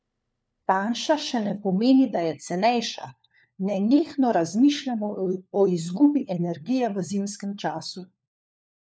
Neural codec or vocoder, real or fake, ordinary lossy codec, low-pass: codec, 16 kHz, 4 kbps, FunCodec, trained on LibriTTS, 50 frames a second; fake; none; none